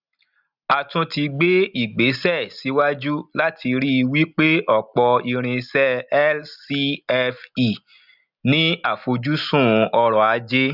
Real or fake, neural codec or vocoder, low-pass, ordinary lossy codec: real; none; 5.4 kHz; none